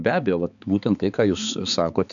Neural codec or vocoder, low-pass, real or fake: codec, 16 kHz, 4 kbps, X-Codec, HuBERT features, trained on balanced general audio; 7.2 kHz; fake